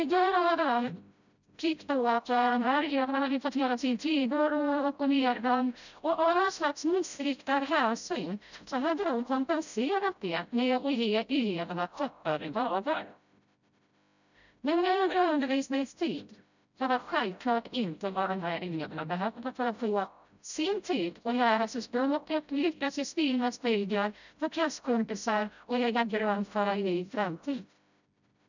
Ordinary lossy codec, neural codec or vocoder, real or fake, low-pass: none; codec, 16 kHz, 0.5 kbps, FreqCodec, smaller model; fake; 7.2 kHz